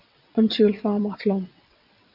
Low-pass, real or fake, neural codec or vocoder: 5.4 kHz; fake; vocoder, 22.05 kHz, 80 mel bands, WaveNeXt